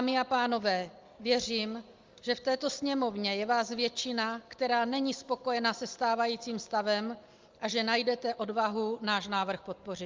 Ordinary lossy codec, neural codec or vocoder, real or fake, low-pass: Opus, 32 kbps; none; real; 7.2 kHz